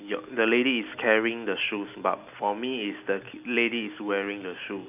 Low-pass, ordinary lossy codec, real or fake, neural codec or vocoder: 3.6 kHz; none; real; none